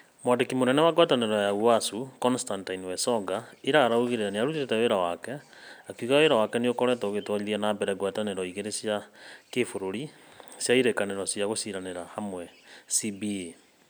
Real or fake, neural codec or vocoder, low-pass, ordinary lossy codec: real; none; none; none